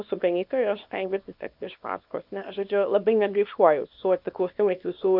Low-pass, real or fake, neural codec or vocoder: 5.4 kHz; fake; codec, 24 kHz, 0.9 kbps, WavTokenizer, small release